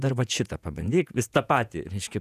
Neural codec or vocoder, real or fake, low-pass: vocoder, 48 kHz, 128 mel bands, Vocos; fake; 14.4 kHz